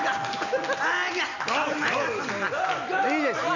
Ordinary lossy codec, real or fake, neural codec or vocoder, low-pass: none; real; none; 7.2 kHz